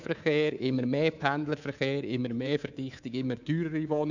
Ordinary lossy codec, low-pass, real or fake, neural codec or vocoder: none; 7.2 kHz; fake; codec, 24 kHz, 3.1 kbps, DualCodec